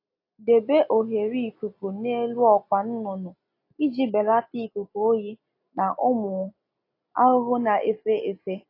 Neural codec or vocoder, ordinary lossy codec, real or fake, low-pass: none; AAC, 32 kbps; real; 5.4 kHz